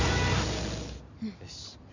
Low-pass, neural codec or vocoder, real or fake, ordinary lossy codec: 7.2 kHz; none; real; none